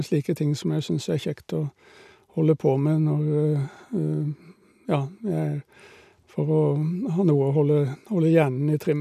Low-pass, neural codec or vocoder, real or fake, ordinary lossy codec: 14.4 kHz; none; real; none